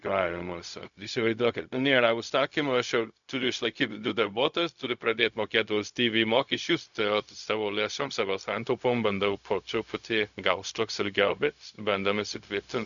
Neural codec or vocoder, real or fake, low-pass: codec, 16 kHz, 0.4 kbps, LongCat-Audio-Codec; fake; 7.2 kHz